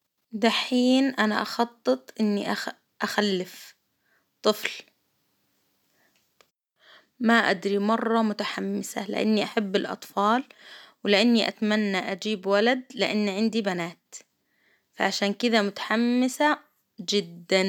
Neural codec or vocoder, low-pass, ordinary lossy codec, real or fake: none; 19.8 kHz; none; real